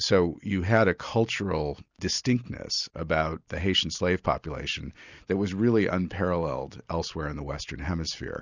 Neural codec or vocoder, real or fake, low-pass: none; real; 7.2 kHz